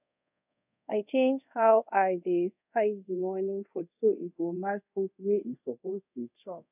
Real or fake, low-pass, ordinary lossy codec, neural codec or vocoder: fake; 3.6 kHz; none; codec, 24 kHz, 0.5 kbps, DualCodec